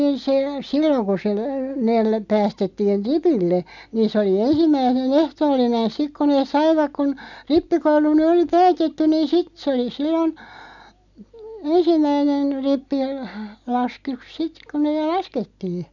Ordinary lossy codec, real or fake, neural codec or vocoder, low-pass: none; real; none; 7.2 kHz